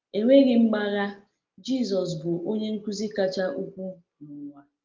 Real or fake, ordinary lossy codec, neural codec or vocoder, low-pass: real; Opus, 32 kbps; none; 7.2 kHz